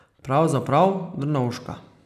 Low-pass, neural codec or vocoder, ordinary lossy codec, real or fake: 14.4 kHz; vocoder, 44.1 kHz, 128 mel bands every 256 samples, BigVGAN v2; none; fake